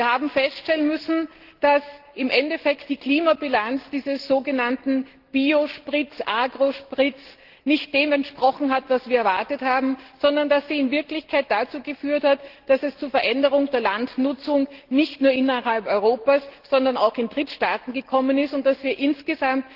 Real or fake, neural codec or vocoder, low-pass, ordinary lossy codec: real; none; 5.4 kHz; Opus, 16 kbps